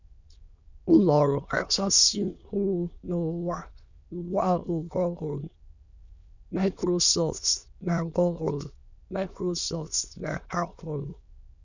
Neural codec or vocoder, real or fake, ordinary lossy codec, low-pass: autoencoder, 22.05 kHz, a latent of 192 numbers a frame, VITS, trained on many speakers; fake; none; 7.2 kHz